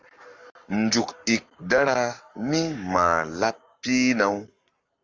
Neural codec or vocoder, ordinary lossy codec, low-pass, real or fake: codec, 44.1 kHz, 7.8 kbps, Pupu-Codec; Opus, 32 kbps; 7.2 kHz; fake